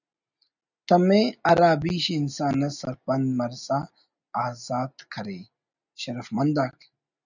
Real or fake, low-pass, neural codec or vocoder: real; 7.2 kHz; none